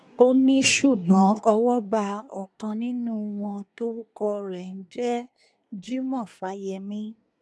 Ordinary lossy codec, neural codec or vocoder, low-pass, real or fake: none; codec, 24 kHz, 1 kbps, SNAC; none; fake